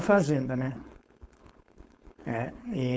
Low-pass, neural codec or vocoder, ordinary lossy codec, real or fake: none; codec, 16 kHz, 4.8 kbps, FACodec; none; fake